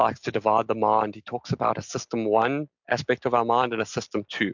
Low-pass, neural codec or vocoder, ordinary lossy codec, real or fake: 7.2 kHz; none; MP3, 64 kbps; real